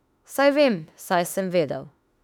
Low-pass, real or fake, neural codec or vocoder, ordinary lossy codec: 19.8 kHz; fake; autoencoder, 48 kHz, 32 numbers a frame, DAC-VAE, trained on Japanese speech; none